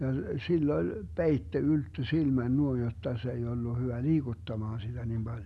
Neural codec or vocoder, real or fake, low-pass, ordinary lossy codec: none; real; none; none